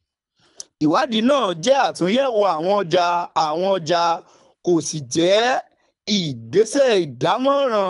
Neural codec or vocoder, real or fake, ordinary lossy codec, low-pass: codec, 24 kHz, 3 kbps, HILCodec; fake; none; 10.8 kHz